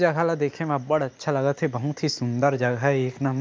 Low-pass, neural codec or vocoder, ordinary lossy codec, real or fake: none; none; none; real